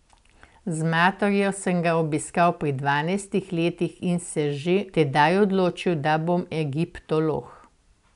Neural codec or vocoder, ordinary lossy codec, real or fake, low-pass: none; none; real; 10.8 kHz